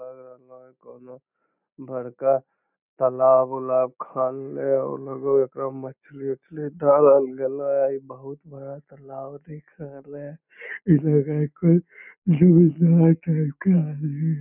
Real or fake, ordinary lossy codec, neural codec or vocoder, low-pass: real; none; none; 3.6 kHz